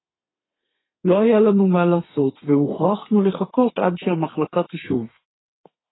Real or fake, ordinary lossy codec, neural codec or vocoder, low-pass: fake; AAC, 16 kbps; codec, 32 kHz, 1.9 kbps, SNAC; 7.2 kHz